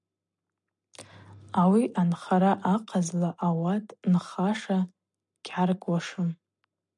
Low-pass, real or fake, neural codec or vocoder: 10.8 kHz; real; none